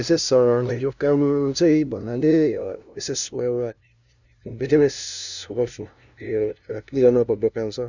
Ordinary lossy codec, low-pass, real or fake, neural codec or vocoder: none; 7.2 kHz; fake; codec, 16 kHz, 0.5 kbps, FunCodec, trained on LibriTTS, 25 frames a second